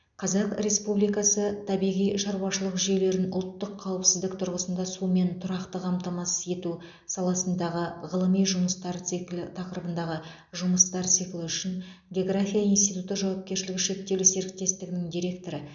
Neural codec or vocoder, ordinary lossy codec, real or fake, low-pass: none; none; real; 7.2 kHz